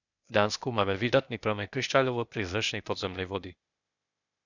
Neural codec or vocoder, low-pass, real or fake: codec, 16 kHz, 0.8 kbps, ZipCodec; 7.2 kHz; fake